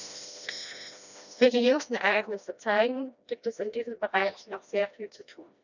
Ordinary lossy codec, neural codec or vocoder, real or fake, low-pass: none; codec, 16 kHz, 1 kbps, FreqCodec, smaller model; fake; 7.2 kHz